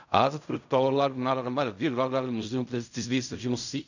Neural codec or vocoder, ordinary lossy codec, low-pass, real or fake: codec, 16 kHz in and 24 kHz out, 0.4 kbps, LongCat-Audio-Codec, fine tuned four codebook decoder; none; 7.2 kHz; fake